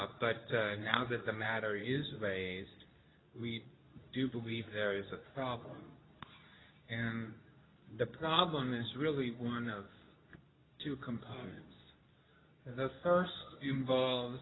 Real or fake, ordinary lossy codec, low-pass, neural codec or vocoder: fake; AAC, 16 kbps; 7.2 kHz; codec, 24 kHz, 0.9 kbps, WavTokenizer, medium speech release version 2